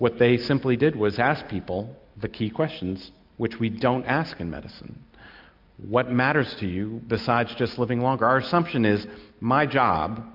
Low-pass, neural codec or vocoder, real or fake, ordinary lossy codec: 5.4 kHz; none; real; MP3, 48 kbps